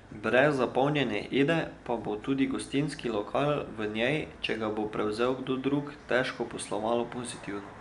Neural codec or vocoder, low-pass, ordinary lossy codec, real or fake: none; 10.8 kHz; none; real